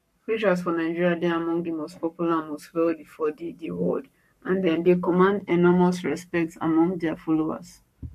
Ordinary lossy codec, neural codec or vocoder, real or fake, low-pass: MP3, 64 kbps; codec, 44.1 kHz, 7.8 kbps, Pupu-Codec; fake; 14.4 kHz